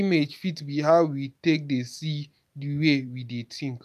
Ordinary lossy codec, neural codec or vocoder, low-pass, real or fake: none; none; 14.4 kHz; real